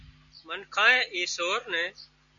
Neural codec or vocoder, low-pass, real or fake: none; 7.2 kHz; real